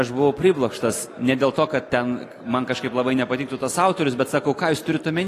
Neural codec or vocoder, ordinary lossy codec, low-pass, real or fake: none; AAC, 48 kbps; 14.4 kHz; real